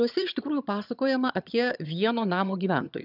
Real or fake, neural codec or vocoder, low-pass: fake; vocoder, 22.05 kHz, 80 mel bands, HiFi-GAN; 5.4 kHz